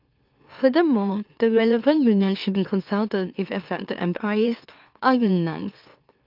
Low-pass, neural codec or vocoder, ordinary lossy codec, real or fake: 5.4 kHz; autoencoder, 44.1 kHz, a latent of 192 numbers a frame, MeloTTS; Opus, 24 kbps; fake